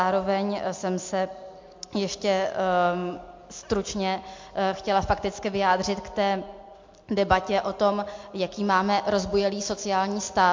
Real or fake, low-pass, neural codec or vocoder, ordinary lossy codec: real; 7.2 kHz; none; MP3, 48 kbps